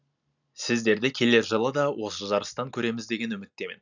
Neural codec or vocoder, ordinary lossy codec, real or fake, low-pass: none; none; real; 7.2 kHz